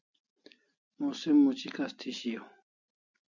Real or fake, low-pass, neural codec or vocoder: real; 7.2 kHz; none